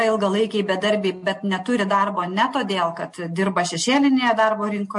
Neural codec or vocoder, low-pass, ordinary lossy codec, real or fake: none; 10.8 kHz; MP3, 48 kbps; real